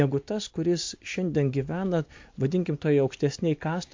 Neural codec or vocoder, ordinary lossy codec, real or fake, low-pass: none; MP3, 48 kbps; real; 7.2 kHz